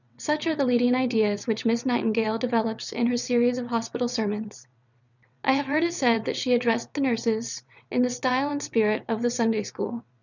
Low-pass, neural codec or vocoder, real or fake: 7.2 kHz; vocoder, 22.05 kHz, 80 mel bands, WaveNeXt; fake